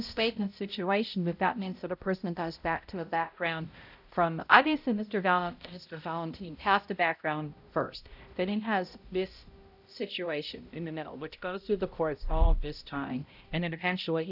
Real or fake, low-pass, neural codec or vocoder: fake; 5.4 kHz; codec, 16 kHz, 0.5 kbps, X-Codec, HuBERT features, trained on balanced general audio